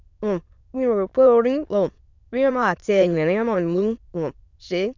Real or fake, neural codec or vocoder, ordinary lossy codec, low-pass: fake; autoencoder, 22.05 kHz, a latent of 192 numbers a frame, VITS, trained on many speakers; none; 7.2 kHz